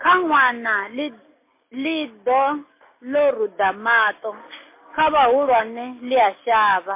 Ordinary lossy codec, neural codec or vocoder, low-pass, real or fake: MP3, 24 kbps; none; 3.6 kHz; real